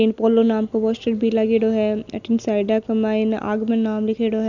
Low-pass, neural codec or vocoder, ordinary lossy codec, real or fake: 7.2 kHz; none; none; real